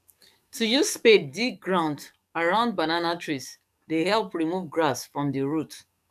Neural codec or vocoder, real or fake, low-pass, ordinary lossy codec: codec, 44.1 kHz, 7.8 kbps, DAC; fake; 14.4 kHz; none